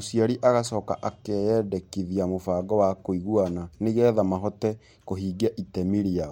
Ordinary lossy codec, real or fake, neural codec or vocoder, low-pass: MP3, 64 kbps; real; none; 19.8 kHz